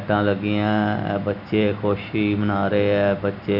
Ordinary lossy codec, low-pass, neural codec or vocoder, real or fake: none; 5.4 kHz; none; real